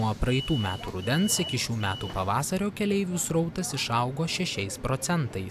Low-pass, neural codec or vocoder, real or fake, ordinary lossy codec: 14.4 kHz; none; real; AAC, 96 kbps